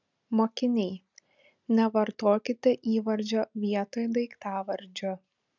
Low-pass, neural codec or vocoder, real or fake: 7.2 kHz; none; real